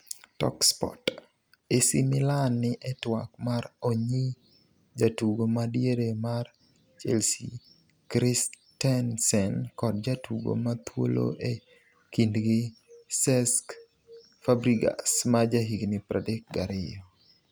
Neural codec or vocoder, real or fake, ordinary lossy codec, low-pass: none; real; none; none